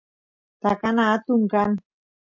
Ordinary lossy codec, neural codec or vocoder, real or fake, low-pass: MP3, 48 kbps; none; real; 7.2 kHz